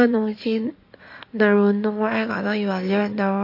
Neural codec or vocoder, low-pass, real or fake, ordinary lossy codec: codec, 16 kHz in and 24 kHz out, 2.2 kbps, FireRedTTS-2 codec; 5.4 kHz; fake; MP3, 32 kbps